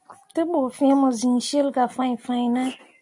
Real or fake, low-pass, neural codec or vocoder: real; 10.8 kHz; none